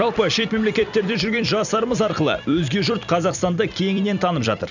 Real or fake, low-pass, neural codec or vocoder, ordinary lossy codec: fake; 7.2 kHz; vocoder, 44.1 kHz, 128 mel bands every 256 samples, BigVGAN v2; none